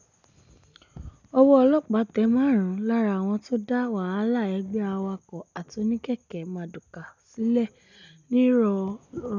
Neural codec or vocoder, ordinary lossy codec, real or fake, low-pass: none; none; real; 7.2 kHz